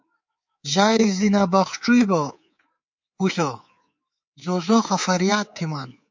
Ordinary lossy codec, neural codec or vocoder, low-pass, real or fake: MP3, 48 kbps; codec, 24 kHz, 3.1 kbps, DualCodec; 7.2 kHz; fake